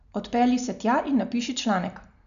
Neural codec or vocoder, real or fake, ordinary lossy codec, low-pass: none; real; none; 7.2 kHz